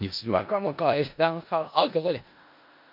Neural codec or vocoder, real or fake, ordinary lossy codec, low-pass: codec, 16 kHz in and 24 kHz out, 0.4 kbps, LongCat-Audio-Codec, four codebook decoder; fake; MP3, 48 kbps; 5.4 kHz